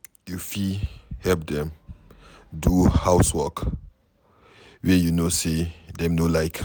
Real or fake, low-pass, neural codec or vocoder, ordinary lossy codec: real; none; none; none